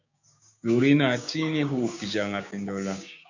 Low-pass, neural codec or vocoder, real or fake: 7.2 kHz; codec, 16 kHz, 6 kbps, DAC; fake